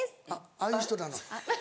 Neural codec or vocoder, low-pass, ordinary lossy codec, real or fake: none; none; none; real